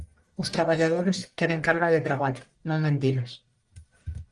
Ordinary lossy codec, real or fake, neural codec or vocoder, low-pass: Opus, 32 kbps; fake; codec, 44.1 kHz, 1.7 kbps, Pupu-Codec; 10.8 kHz